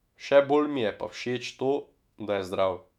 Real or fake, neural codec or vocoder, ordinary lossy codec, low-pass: fake; autoencoder, 48 kHz, 128 numbers a frame, DAC-VAE, trained on Japanese speech; none; 19.8 kHz